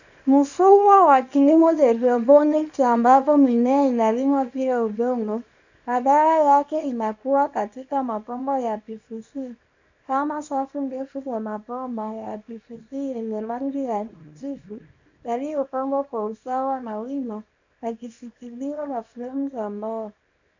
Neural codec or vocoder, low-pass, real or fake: codec, 24 kHz, 0.9 kbps, WavTokenizer, small release; 7.2 kHz; fake